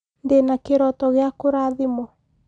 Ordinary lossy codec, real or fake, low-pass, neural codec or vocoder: none; real; 9.9 kHz; none